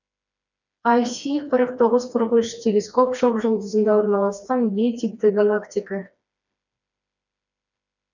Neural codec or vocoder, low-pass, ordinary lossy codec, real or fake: codec, 16 kHz, 2 kbps, FreqCodec, smaller model; 7.2 kHz; none; fake